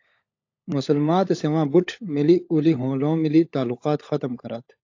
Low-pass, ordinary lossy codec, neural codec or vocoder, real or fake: 7.2 kHz; AAC, 48 kbps; codec, 16 kHz, 16 kbps, FunCodec, trained on LibriTTS, 50 frames a second; fake